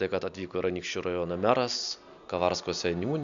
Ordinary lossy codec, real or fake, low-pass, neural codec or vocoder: Opus, 64 kbps; real; 7.2 kHz; none